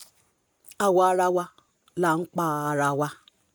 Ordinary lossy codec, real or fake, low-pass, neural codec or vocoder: none; real; none; none